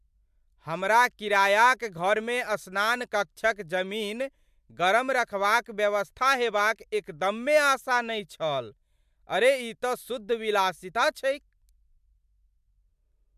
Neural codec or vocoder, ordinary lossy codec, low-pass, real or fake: none; MP3, 96 kbps; 14.4 kHz; real